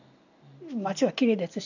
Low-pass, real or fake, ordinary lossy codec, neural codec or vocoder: 7.2 kHz; real; none; none